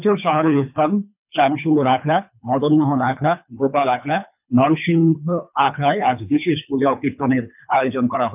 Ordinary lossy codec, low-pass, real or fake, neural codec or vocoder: none; 3.6 kHz; fake; codec, 24 kHz, 3 kbps, HILCodec